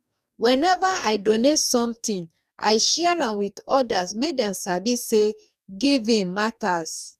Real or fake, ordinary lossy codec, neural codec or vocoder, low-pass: fake; none; codec, 44.1 kHz, 2.6 kbps, DAC; 14.4 kHz